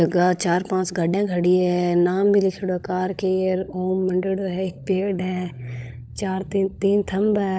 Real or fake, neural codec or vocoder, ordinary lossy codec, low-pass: fake; codec, 16 kHz, 16 kbps, FunCodec, trained on LibriTTS, 50 frames a second; none; none